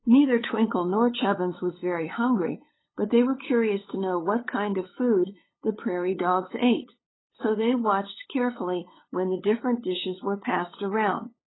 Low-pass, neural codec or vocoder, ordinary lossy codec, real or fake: 7.2 kHz; codec, 16 kHz, 8 kbps, FunCodec, trained on LibriTTS, 25 frames a second; AAC, 16 kbps; fake